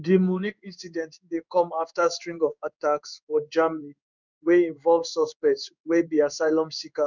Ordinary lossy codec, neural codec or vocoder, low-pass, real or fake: none; codec, 16 kHz in and 24 kHz out, 1 kbps, XY-Tokenizer; 7.2 kHz; fake